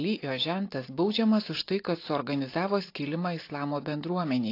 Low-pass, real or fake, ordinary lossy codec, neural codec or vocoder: 5.4 kHz; real; AAC, 32 kbps; none